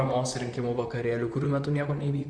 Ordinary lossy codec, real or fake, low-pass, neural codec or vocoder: Opus, 64 kbps; fake; 9.9 kHz; vocoder, 44.1 kHz, 128 mel bands, Pupu-Vocoder